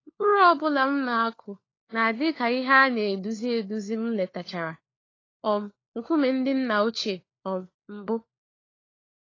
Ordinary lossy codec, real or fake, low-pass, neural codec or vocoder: AAC, 32 kbps; fake; 7.2 kHz; codec, 16 kHz, 4 kbps, FunCodec, trained on LibriTTS, 50 frames a second